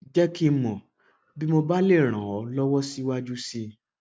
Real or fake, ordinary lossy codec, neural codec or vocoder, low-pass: real; none; none; none